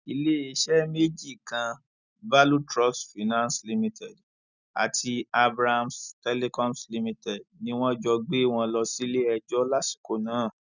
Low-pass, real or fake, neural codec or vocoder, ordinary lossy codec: 7.2 kHz; real; none; none